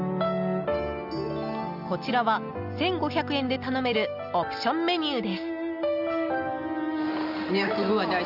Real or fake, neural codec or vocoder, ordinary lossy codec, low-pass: real; none; none; 5.4 kHz